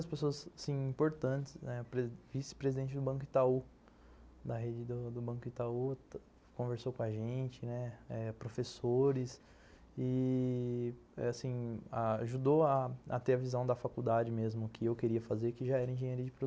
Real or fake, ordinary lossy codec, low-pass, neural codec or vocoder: real; none; none; none